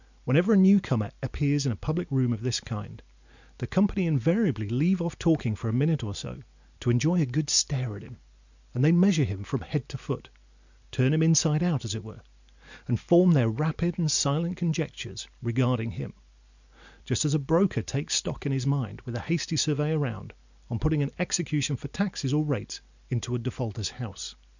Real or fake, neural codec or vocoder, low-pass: real; none; 7.2 kHz